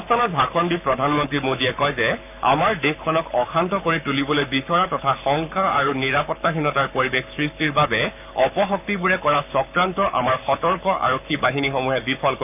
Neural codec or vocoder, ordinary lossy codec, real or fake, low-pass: codec, 44.1 kHz, 7.8 kbps, Pupu-Codec; none; fake; 3.6 kHz